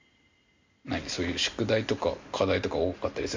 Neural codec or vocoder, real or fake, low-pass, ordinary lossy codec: none; real; 7.2 kHz; MP3, 64 kbps